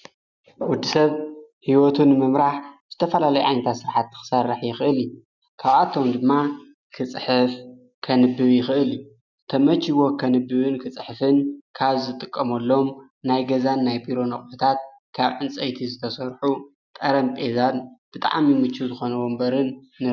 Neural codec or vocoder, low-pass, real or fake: none; 7.2 kHz; real